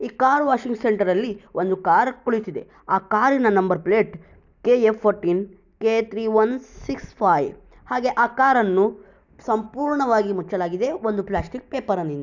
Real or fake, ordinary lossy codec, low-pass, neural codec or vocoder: real; none; 7.2 kHz; none